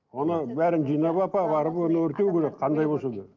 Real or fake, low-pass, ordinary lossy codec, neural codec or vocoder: real; 7.2 kHz; Opus, 32 kbps; none